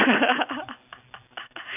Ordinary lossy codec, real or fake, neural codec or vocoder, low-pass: none; real; none; 3.6 kHz